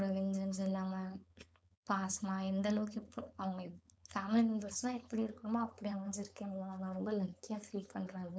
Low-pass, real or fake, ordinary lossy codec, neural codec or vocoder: none; fake; none; codec, 16 kHz, 4.8 kbps, FACodec